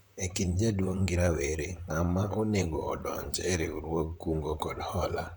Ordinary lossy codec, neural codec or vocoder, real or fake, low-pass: none; vocoder, 44.1 kHz, 128 mel bands, Pupu-Vocoder; fake; none